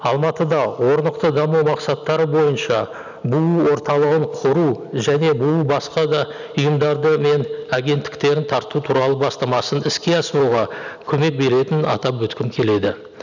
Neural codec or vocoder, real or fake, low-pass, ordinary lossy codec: none; real; 7.2 kHz; none